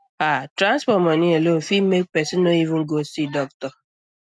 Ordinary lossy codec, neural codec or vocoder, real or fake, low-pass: none; none; real; none